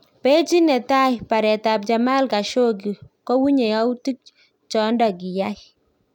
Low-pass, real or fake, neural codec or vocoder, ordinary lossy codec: 19.8 kHz; real; none; none